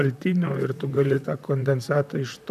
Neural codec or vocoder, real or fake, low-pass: vocoder, 44.1 kHz, 128 mel bands, Pupu-Vocoder; fake; 14.4 kHz